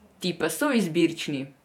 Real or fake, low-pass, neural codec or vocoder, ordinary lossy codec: fake; 19.8 kHz; vocoder, 48 kHz, 128 mel bands, Vocos; none